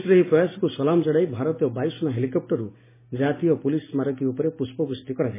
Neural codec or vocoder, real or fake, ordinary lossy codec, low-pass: none; real; MP3, 16 kbps; 3.6 kHz